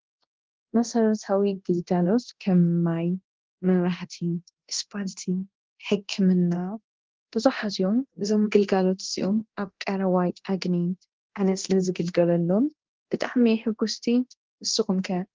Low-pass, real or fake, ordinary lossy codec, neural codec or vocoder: 7.2 kHz; fake; Opus, 16 kbps; codec, 24 kHz, 0.9 kbps, DualCodec